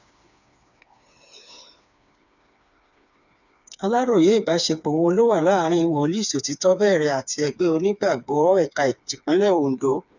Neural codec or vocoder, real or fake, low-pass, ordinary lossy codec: codec, 16 kHz, 4 kbps, FreqCodec, smaller model; fake; 7.2 kHz; none